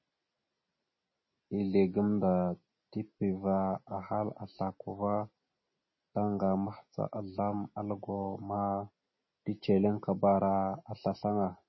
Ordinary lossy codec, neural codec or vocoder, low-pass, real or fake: MP3, 24 kbps; none; 7.2 kHz; real